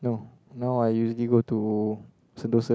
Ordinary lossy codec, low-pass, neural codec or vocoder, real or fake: none; none; none; real